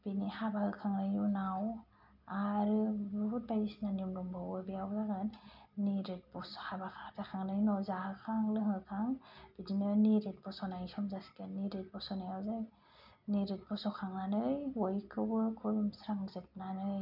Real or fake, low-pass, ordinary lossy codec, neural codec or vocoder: real; 5.4 kHz; none; none